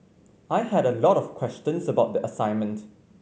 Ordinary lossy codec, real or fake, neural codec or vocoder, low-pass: none; real; none; none